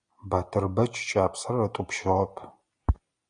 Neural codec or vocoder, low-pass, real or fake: none; 9.9 kHz; real